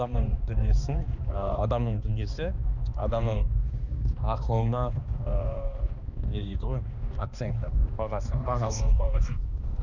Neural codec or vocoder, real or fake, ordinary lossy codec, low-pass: codec, 16 kHz, 2 kbps, X-Codec, HuBERT features, trained on balanced general audio; fake; none; 7.2 kHz